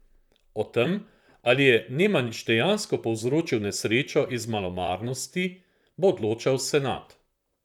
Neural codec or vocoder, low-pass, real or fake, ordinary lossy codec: vocoder, 44.1 kHz, 128 mel bands, Pupu-Vocoder; 19.8 kHz; fake; none